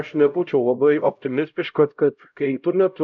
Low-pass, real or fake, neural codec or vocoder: 7.2 kHz; fake; codec, 16 kHz, 0.5 kbps, X-Codec, HuBERT features, trained on LibriSpeech